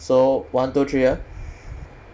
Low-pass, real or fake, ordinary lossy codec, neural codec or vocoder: none; real; none; none